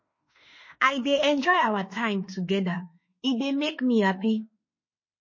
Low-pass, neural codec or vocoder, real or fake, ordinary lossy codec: 7.2 kHz; autoencoder, 48 kHz, 32 numbers a frame, DAC-VAE, trained on Japanese speech; fake; MP3, 32 kbps